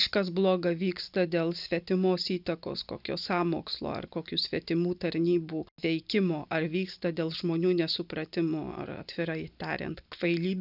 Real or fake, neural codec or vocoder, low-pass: real; none; 5.4 kHz